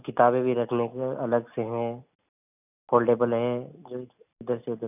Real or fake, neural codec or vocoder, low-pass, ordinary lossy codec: real; none; 3.6 kHz; none